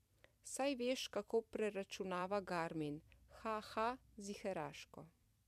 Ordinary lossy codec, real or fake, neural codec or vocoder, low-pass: MP3, 96 kbps; fake; vocoder, 44.1 kHz, 128 mel bands every 256 samples, BigVGAN v2; 14.4 kHz